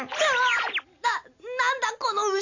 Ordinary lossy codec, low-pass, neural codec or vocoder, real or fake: AAC, 48 kbps; 7.2 kHz; none; real